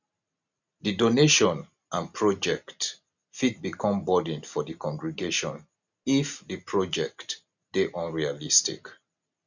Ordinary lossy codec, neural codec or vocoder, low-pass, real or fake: none; none; 7.2 kHz; real